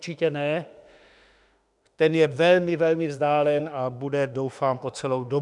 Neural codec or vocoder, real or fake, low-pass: autoencoder, 48 kHz, 32 numbers a frame, DAC-VAE, trained on Japanese speech; fake; 10.8 kHz